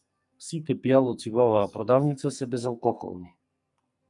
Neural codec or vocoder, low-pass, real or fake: codec, 44.1 kHz, 2.6 kbps, SNAC; 10.8 kHz; fake